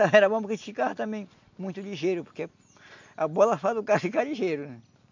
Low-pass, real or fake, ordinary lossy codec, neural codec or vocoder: 7.2 kHz; real; MP3, 64 kbps; none